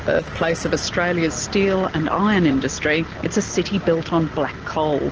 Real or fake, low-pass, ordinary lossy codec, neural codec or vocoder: real; 7.2 kHz; Opus, 16 kbps; none